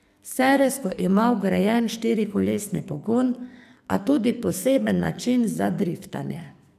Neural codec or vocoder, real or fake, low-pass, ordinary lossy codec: codec, 44.1 kHz, 2.6 kbps, SNAC; fake; 14.4 kHz; none